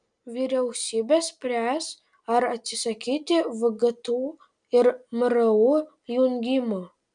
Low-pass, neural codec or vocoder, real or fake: 9.9 kHz; none; real